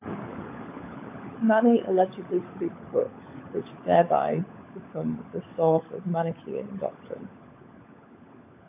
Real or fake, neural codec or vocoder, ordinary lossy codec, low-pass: fake; codec, 16 kHz, 16 kbps, FunCodec, trained on LibriTTS, 50 frames a second; AAC, 24 kbps; 3.6 kHz